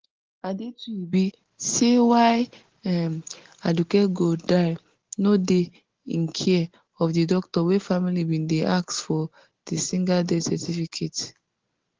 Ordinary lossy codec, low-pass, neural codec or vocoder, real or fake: Opus, 16 kbps; 7.2 kHz; none; real